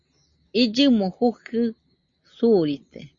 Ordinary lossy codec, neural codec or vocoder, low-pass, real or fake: Opus, 64 kbps; none; 7.2 kHz; real